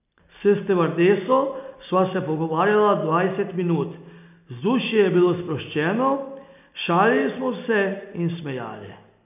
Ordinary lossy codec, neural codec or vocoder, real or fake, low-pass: none; none; real; 3.6 kHz